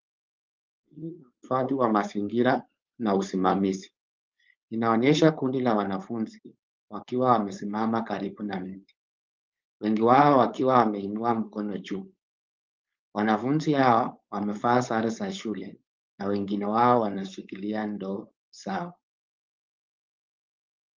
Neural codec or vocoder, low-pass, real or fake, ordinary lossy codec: codec, 16 kHz, 4.8 kbps, FACodec; 7.2 kHz; fake; Opus, 24 kbps